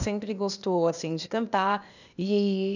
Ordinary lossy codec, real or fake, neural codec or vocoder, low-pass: none; fake; codec, 16 kHz, 0.8 kbps, ZipCodec; 7.2 kHz